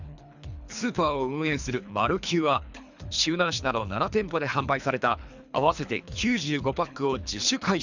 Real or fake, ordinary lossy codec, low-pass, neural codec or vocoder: fake; none; 7.2 kHz; codec, 24 kHz, 3 kbps, HILCodec